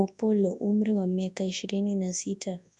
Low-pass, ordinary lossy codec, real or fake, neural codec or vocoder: 10.8 kHz; none; fake; codec, 24 kHz, 0.9 kbps, WavTokenizer, large speech release